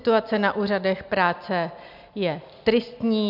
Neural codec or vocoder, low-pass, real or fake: none; 5.4 kHz; real